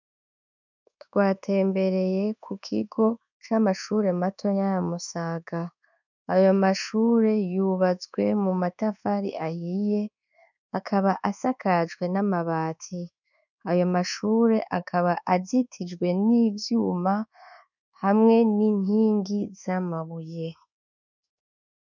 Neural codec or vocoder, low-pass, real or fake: codec, 24 kHz, 1.2 kbps, DualCodec; 7.2 kHz; fake